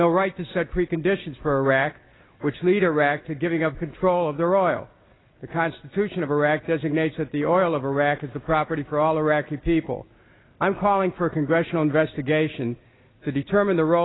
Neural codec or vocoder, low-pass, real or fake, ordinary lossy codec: none; 7.2 kHz; real; AAC, 16 kbps